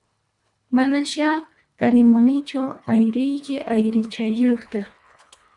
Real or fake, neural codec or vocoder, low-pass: fake; codec, 24 kHz, 1.5 kbps, HILCodec; 10.8 kHz